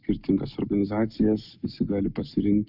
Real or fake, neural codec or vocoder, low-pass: real; none; 5.4 kHz